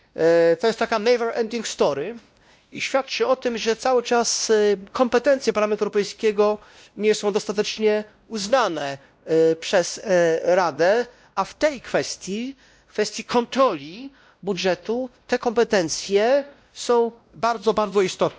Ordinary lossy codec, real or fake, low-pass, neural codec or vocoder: none; fake; none; codec, 16 kHz, 1 kbps, X-Codec, WavLM features, trained on Multilingual LibriSpeech